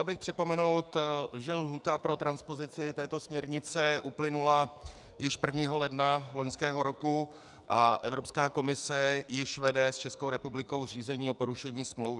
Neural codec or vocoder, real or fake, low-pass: codec, 44.1 kHz, 2.6 kbps, SNAC; fake; 10.8 kHz